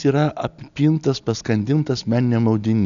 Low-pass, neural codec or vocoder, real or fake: 7.2 kHz; none; real